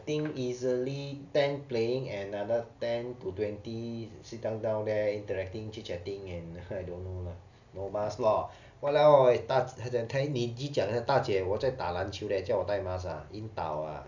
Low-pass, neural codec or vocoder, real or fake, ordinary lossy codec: 7.2 kHz; none; real; none